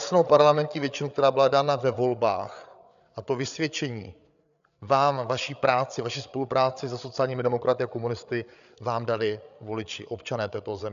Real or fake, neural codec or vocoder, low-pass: fake; codec, 16 kHz, 8 kbps, FreqCodec, larger model; 7.2 kHz